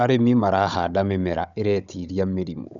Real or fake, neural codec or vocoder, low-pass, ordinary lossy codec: fake; codec, 16 kHz, 16 kbps, FunCodec, trained on Chinese and English, 50 frames a second; 7.2 kHz; none